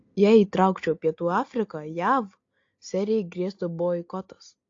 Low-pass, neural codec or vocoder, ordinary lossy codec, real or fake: 7.2 kHz; none; AAC, 48 kbps; real